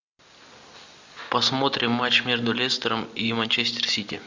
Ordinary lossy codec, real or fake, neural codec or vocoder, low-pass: MP3, 64 kbps; real; none; 7.2 kHz